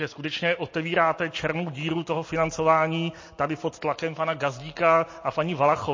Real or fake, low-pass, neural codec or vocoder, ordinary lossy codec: real; 7.2 kHz; none; MP3, 32 kbps